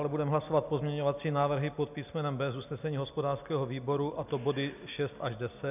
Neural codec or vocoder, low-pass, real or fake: none; 3.6 kHz; real